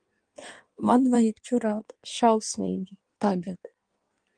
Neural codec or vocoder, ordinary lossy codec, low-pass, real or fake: codec, 16 kHz in and 24 kHz out, 1.1 kbps, FireRedTTS-2 codec; Opus, 24 kbps; 9.9 kHz; fake